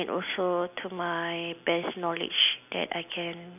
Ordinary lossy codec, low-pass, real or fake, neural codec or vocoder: none; 3.6 kHz; real; none